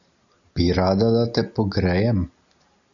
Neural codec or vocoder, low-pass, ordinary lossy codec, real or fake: none; 7.2 kHz; MP3, 96 kbps; real